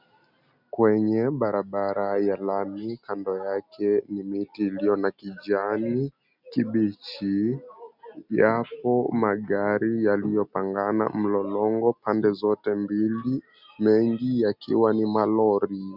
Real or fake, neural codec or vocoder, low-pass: real; none; 5.4 kHz